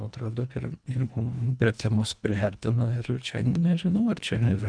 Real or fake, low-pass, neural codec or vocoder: fake; 9.9 kHz; codec, 24 kHz, 1.5 kbps, HILCodec